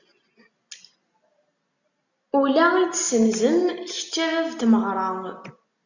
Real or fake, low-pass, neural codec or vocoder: real; 7.2 kHz; none